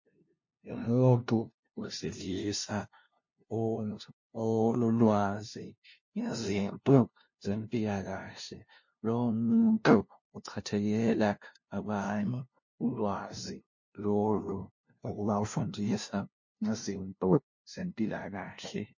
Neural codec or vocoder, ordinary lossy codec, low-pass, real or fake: codec, 16 kHz, 0.5 kbps, FunCodec, trained on LibriTTS, 25 frames a second; MP3, 32 kbps; 7.2 kHz; fake